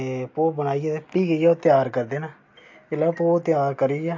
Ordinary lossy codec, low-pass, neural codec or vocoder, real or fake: MP3, 48 kbps; 7.2 kHz; none; real